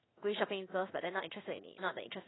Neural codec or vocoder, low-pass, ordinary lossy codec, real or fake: codec, 16 kHz in and 24 kHz out, 1 kbps, XY-Tokenizer; 7.2 kHz; AAC, 16 kbps; fake